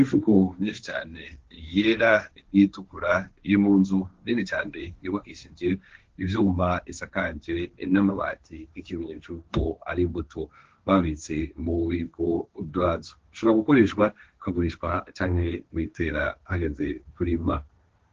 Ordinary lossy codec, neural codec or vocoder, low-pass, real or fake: Opus, 16 kbps; codec, 16 kHz, 1.1 kbps, Voila-Tokenizer; 7.2 kHz; fake